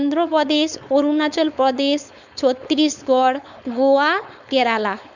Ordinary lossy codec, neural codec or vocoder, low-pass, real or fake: none; codec, 16 kHz, 4.8 kbps, FACodec; 7.2 kHz; fake